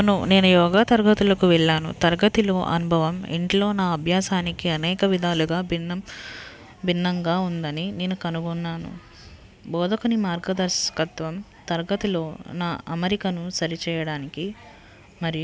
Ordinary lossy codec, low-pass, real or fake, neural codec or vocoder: none; none; real; none